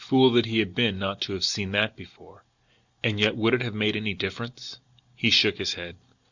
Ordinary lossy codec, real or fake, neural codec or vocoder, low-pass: Opus, 64 kbps; real; none; 7.2 kHz